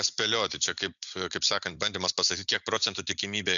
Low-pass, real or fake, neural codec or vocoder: 7.2 kHz; real; none